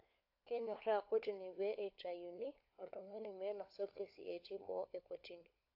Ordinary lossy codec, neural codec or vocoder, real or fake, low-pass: AAC, 32 kbps; codec, 16 kHz, 4 kbps, FunCodec, trained on LibriTTS, 50 frames a second; fake; 5.4 kHz